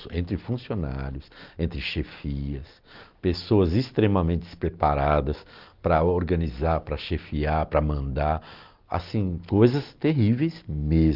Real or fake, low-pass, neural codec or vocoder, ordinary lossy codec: real; 5.4 kHz; none; Opus, 32 kbps